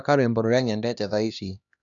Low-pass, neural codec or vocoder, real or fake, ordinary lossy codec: 7.2 kHz; codec, 16 kHz, 1 kbps, X-Codec, HuBERT features, trained on LibriSpeech; fake; none